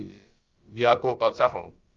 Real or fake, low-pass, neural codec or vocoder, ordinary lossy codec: fake; 7.2 kHz; codec, 16 kHz, about 1 kbps, DyCAST, with the encoder's durations; Opus, 32 kbps